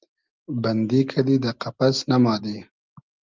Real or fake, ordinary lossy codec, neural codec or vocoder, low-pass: real; Opus, 32 kbps; none; 7.2 kHz